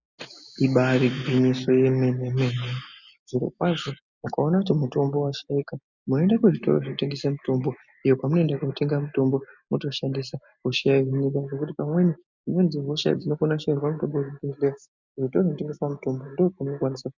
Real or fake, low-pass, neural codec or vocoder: real; 7.2 kHz; none